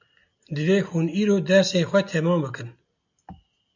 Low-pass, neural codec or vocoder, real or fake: 7.2 kHz; none; real